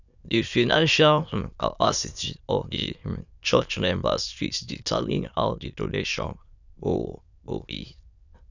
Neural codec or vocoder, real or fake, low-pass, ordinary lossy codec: autoencoder, 22.05 kHz, a latent of 192 numbers a frame, VITS, trained on many speakers; fake; 7.2 kHz; none